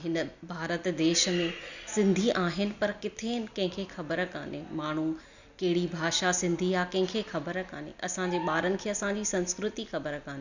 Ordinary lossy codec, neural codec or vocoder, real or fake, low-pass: none; none; real; 7.2 kHz